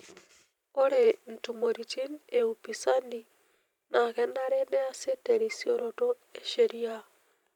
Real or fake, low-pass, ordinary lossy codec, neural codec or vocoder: fake; 19.8 kHz; none; vocoder, 44.1 kHz, 128 mel bands every 512 samples, BigVGAN v2